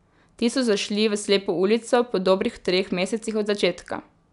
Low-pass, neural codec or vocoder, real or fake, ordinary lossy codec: 10.8 kHz; none; real; none